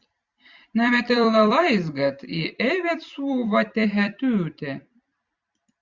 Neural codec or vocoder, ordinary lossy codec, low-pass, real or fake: vocoder, 44.1 kHz, 128 mel bands every 256 samples, BigVGAN v2; Opus, 64 kbps; 7.2 kHz; fake